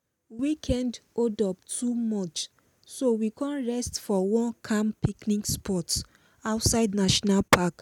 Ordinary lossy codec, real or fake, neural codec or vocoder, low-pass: none; real; none; 19.8 kHz